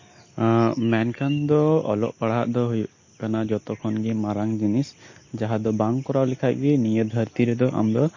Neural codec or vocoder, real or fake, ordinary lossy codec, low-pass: none; real; MP3, 32 kbps; 7.2 kHz